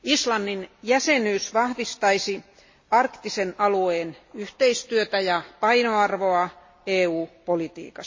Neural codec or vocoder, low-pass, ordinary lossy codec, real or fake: none; 7.2 kHz; MP3, 32 kbps; real